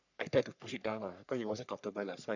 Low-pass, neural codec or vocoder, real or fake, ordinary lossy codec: 7.2 kHz; codec, 44.1 kHz, 3.4 kbps, Pupu-Codec; fake; none